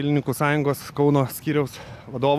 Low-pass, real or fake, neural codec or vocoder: 14.4 kHz; real; none